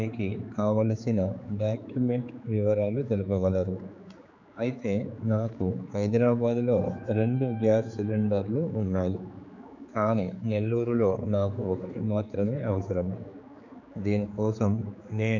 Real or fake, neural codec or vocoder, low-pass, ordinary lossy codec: fake; codec, 16 kHz, 4 kbps, X-Codec, HuBERT features, trained on general audio; 7.2 kHz; none